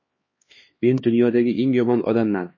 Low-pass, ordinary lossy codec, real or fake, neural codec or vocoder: 7.2 kHz; MP3, 32 kbps; fake; codec, 16 kHz, 2 kbps, X-Codec, HuBERT features, trained on LibriSpeech